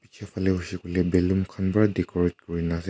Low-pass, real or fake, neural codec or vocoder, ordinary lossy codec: none; real; none; none